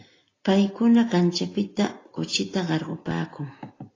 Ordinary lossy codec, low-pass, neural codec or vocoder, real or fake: AAC, 32 kbps; 7.2 kHz; none; real